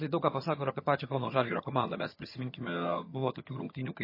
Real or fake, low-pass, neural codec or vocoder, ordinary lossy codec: fake; 5.4 kHz; vocoder, 22.05 kHz, 80 mel bands, HiFi-GAN; MP3, 24 kbps